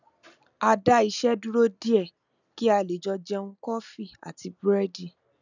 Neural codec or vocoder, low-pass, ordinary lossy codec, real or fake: none; 7.2 kHz; none; real